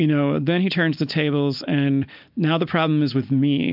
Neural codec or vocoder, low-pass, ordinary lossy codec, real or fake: none; 5.4 kHz; MP3, 48 kbps; real